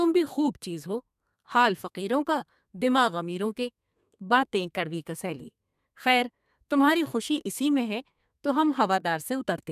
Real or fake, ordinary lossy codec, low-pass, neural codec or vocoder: fake; none; 14.4 kHz; codec, 32 kHz, 1.9 kbps, SNAC